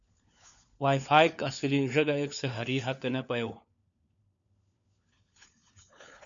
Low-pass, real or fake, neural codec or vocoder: 7.2 kHz; fake; codec, 16 kHz, 4 kbps, FunCodec, trained on LibriTTS, 50 frames a second